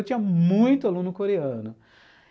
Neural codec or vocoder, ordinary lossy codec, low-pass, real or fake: none; none; none; real